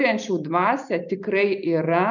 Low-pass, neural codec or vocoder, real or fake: 7.2 kHz; none; real